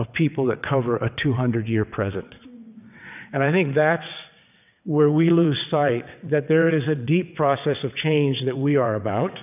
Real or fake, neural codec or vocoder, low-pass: fake; vocoder, 22.05 kHz, 80 mel bands, WaveNeXt; 3.6 kHz